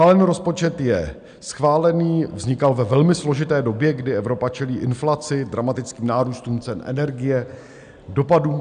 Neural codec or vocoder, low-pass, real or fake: none; 9.9 kHz; real